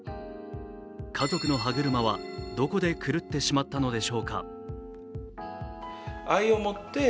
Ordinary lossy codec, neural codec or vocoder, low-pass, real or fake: none; none; none; real